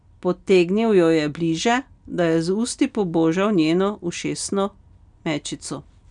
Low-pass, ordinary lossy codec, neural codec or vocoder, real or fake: 9.9 kHz; none; none; real